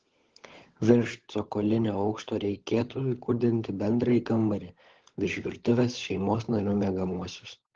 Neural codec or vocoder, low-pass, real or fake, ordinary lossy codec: codec, 16 kHz, 16 kbps, FunCodec, trained on LibriTTS, 50 frames a second; 7.2 kHz; fake; Opus, 16 kbps